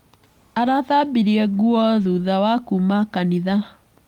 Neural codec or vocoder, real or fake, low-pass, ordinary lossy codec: none; real; 19.8 kHz; Opus, 32 kbps